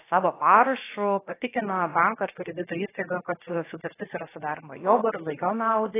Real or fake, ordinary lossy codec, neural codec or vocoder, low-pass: fake; AAC, 16 kbps; codec, 16 kHz, about 1 kbps, DyCAST, with the encoder's durations; 3.6 kHz